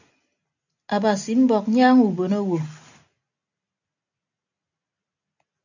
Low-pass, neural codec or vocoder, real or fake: 7.2 kHz; none; real